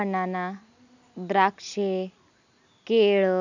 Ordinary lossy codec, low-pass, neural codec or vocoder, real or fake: none; 7.2 kHz; none; real